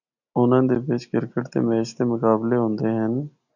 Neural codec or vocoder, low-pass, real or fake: none; 7.2 kHz; real